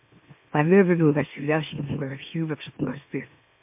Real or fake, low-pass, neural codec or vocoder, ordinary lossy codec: fake; 3.6 kHz; autoencoder, 44.1 kHz, a latent of 192 numbers a frame, MeloTTS; MP3, 32 kbps